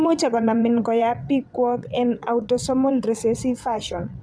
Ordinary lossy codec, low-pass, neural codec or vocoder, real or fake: none; none; vocoder, 22.05 kHz, 80 mel bands, WaveNeXt; fake